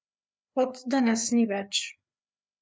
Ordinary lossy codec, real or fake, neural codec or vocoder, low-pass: none; fake; codec, 16 kHz, 4 kbps, FreqCodec, larger model; none